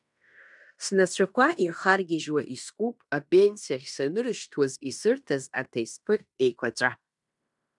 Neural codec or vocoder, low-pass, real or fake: codec, 16 kHz in and 24 kHz out, 0.9 kbps, LongCat-Audio-Codec, fine tuned four codebook decoder; 10.8 kHz; fake